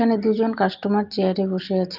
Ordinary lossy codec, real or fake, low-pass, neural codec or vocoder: Opus, 32 kbps; real; 5.4 kHz; none